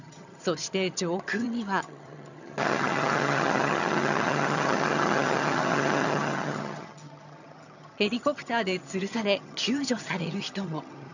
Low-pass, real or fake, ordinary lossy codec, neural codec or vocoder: 7.2 kHz; fake; none; vocoder, 22.05 kHz, 80 mel bands, HiFi-GAN